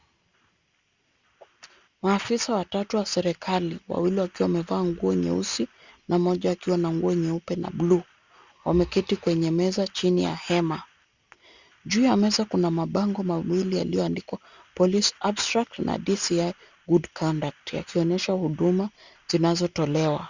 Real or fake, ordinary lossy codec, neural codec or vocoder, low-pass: real; Opus, 64 kbps; none; 7.2 kHz